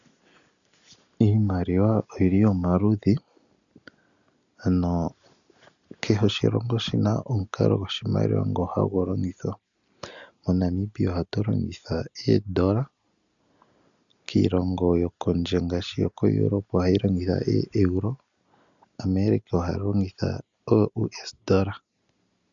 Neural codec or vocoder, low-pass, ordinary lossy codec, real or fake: none; 7.2 kHz; MP3, 96 kbps; real